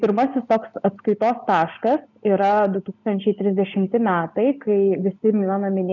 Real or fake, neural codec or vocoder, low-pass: real; none; 7.2 kHz